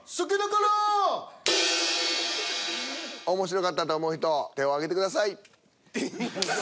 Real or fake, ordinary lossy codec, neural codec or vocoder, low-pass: real; none; none; none